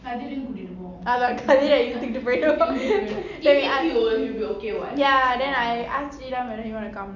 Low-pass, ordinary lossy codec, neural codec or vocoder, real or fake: 7.2 kHz; none; none; real